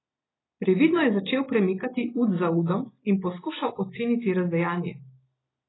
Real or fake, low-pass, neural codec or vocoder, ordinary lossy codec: real; 7.2 kHz; none; AAC, 16 kbps